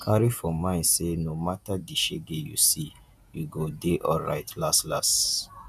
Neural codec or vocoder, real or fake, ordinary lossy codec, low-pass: vocoder, 48 kHz, 128 mel bands, Vocos; fake; none; 14.4 kHz